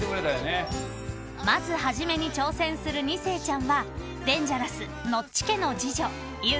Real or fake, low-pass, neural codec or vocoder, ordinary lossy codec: real; none; none; none